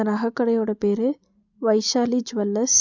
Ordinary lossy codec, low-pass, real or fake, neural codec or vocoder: none; 7.2 kHz; real; none